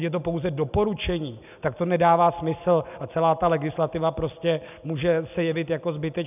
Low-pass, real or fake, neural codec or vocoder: 3.6 kHz; real; none